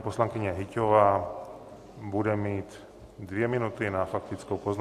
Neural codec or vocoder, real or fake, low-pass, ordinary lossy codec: none; real; 14.4 kHz; AAC, 64 kbps